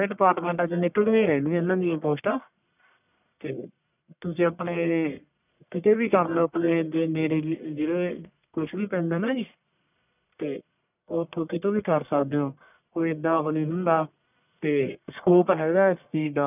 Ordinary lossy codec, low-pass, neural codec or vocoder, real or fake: none; 3.6 kHz; codec, 44.1 kHz, 1.7 kbps, Pupu-Codec; fake